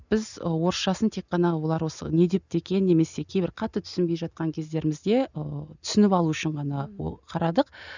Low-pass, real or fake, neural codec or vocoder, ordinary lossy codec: 7.2 kHz; real; none; none